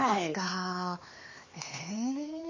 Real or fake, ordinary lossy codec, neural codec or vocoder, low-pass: fake; MP3, 32 kbps; codec, 16 kHz, 4 kbps, X-Codec, HuBERT features, trained on LibriSpeech; 7.2 kHz